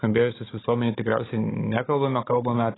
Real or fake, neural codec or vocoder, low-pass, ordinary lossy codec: fake; codec, 16 kHz, 16 kbps, FunCodec, trained on Chinese and English, 50 frames a second; 7.2 kHz; AAC, 16 kbps